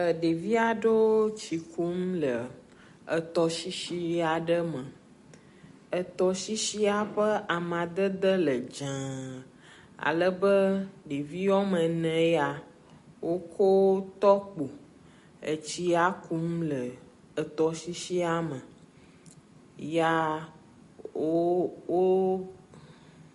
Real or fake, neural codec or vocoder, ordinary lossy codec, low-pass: real; none; MP3, 48 kbps; 14.4 kHz